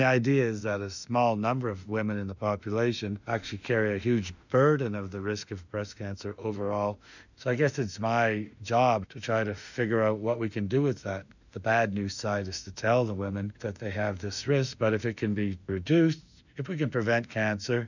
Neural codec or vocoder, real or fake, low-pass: autoencoder, 48 kHz, 32 numbers a frame, DAC-VAE, trained on Japanese speech; fake; 7.2 kHz